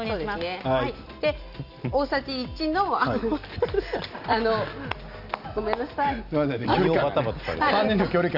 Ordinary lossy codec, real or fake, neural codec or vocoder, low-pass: none; real; none; 5.4 kHz